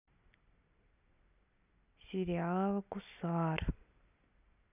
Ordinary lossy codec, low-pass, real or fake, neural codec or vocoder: none; 3.6 kHz; fake; vocoder, 44.1 kHz, 128 mel bands every 256 samples, BigVGAN v2